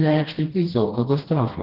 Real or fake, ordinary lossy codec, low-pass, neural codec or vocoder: fake; Opus, 16 kbps; 5.4 kHz; codec, 16 kHz, 1 kbps, FreqCodec, smaller model